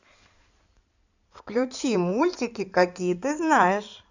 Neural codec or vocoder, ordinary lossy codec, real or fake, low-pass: codec, 16 kHz in and 24 kHz out, 2.2 kbps, FireRedTTS-2 codec; none; fake; 7.2 kHz